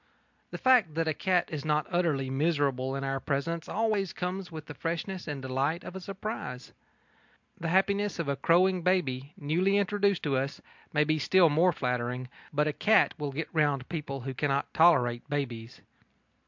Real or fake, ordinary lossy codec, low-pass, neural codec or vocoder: real; MP3, 64 kbps; 7.2 kHz; none